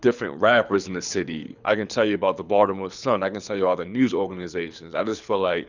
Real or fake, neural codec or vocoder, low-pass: fake; codec, 24 kHz, 6 kbps, HILCodec; 7.2 kHz